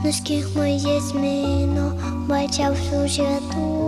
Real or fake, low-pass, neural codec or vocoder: real; 14.4 kHz; none